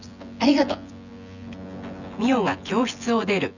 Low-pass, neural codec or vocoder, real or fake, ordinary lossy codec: 7.2 kHz; vocoder, 24 kHz, 100 mel bands, Vocos; fake; none